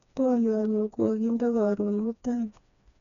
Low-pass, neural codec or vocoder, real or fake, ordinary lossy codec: 7.2 kHz; codec, 16 kHz, 2 kbps, FreqCodec, smaller model; fake; none